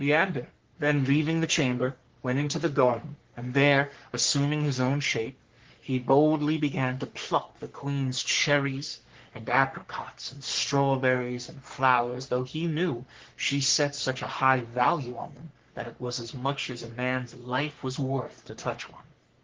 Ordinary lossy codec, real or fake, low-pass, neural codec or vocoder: Opus, 16 kbps; fake; 7.2 kHz; codec, 44.1 kHz, 3.4 kbps, Pupu-Codec